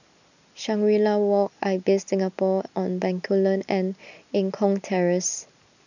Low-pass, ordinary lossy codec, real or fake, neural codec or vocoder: 7.2 kHz; none; real; none